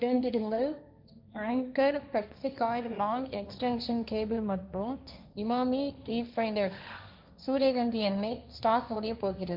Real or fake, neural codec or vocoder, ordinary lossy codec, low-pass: fake; codec, 16 kHz, 1.1 kbps, Voila-Tokenizer; MP3, 48 kbps; 5.4 kHz